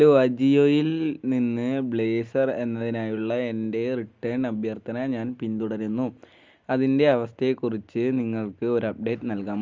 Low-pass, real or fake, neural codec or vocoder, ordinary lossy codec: 7.2 kHz; real; none; Opus, 32 kbps